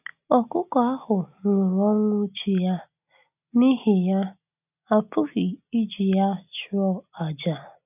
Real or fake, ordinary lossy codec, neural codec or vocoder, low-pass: real; none; none; 3.6 kHz